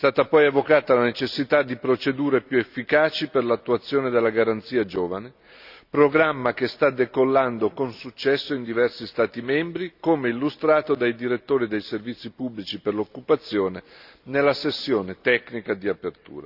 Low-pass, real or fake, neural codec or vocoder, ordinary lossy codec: 5.4 kHz; real; none; none